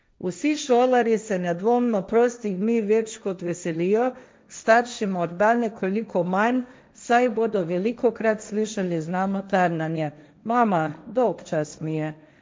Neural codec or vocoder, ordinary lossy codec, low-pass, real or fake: codec, 16 kHz, 1.1 kbps, Voila-Tokenizer; none; none; fake